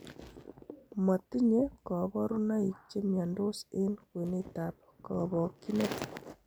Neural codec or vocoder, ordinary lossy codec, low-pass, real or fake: none; none; none; real